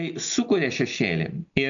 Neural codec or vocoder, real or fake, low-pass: none; real; 7.2 kHz